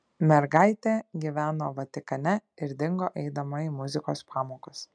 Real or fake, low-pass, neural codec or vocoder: real; 9.9 kHz; none